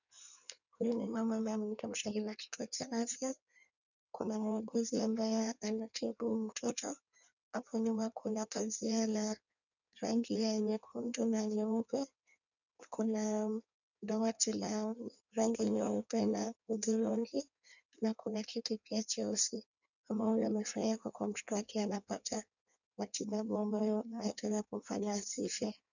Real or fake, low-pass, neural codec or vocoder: fake; 7.2 kHz; codec, 16 kHz in and 24 kHz out, 1.1 kbps, FireRedTTS-2 codec